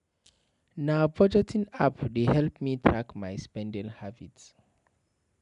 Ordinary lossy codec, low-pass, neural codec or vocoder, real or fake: none; 10.8 kHz; none; real